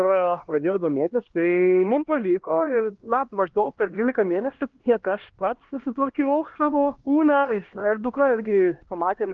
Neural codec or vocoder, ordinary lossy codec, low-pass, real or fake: codec, 16 kHz, 1 kbps, X-Codec, HuBERT features, trained on LibriSpeech; Opus, 16 kbps; 7.2 kHz; fake